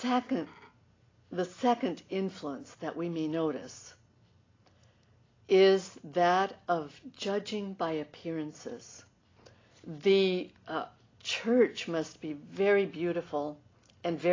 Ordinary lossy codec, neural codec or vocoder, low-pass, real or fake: AAC, 32 kbps; none; 7.2 kHz; real